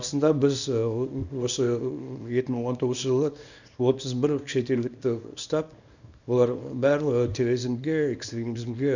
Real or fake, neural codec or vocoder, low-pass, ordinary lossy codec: fake; codec, 24 kHz, 0.9 kbps, WavTokenizer, small release; 7.2 kHz; none